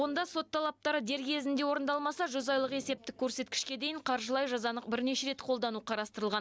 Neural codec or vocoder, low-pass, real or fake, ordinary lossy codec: none; none; real; none